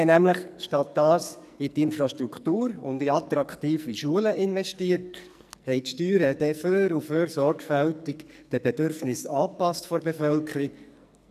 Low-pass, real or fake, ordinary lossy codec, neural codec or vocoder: 14.4 kHz; fake; none; codec, 44.1 kHz, 2.6 kbps, SNAC